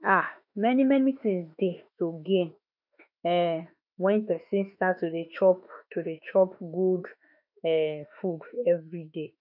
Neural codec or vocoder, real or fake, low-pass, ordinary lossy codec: autoencoder, 48 kHz, 32 numbers a frame, DAC-VAE, trained on Japanese speech; fake; 5.4 kHz; none